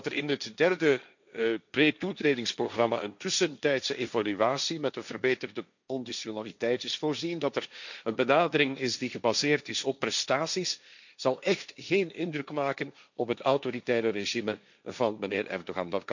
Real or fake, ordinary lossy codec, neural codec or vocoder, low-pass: fake; none; codec, 16 kHz, 1.1 kbps, Voila-Tokenizer; 7.2 kHz